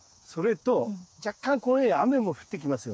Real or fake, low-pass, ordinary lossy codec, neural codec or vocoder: fake; none; none; codec, 16 kHz, 4 kbps, FreqCodec, smaller model